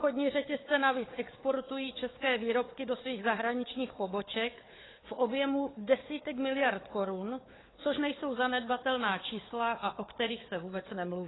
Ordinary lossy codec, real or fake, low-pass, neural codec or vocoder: AAC, 16 kbps; fake; 7.2 kHz; codec, 16 kHz, 4 kbps, FunCodec, trained on Chinese and English, 50 frames a second